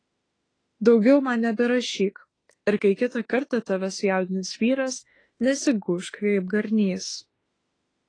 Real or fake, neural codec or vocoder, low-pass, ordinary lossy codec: fake; autoencoder, 48 kHz, 32 numbers a frame, DAC-VAE, trained on Japanese speech; 9.9 kHz; AAC, 32 kbps